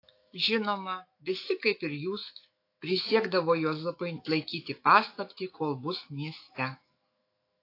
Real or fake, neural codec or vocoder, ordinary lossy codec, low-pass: fake; autoencoder, 48 kHz, 128 numbers a frame, DAC-VAE, trained on Japanese speech; AAC, 32 kbps; 5.4 kHz